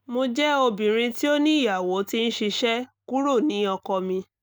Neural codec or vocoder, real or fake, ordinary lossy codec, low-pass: none; real; none; none